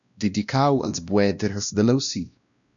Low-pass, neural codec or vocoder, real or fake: 7.2 kHz; codec, 16 kHz, 1 kbps, X-Codec, WavLM features, trained on Multilingual LibriSpeech; fake